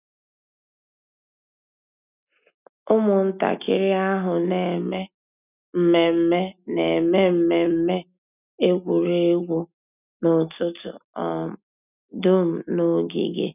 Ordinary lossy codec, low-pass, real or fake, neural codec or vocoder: none; 3.6 kHz; real; none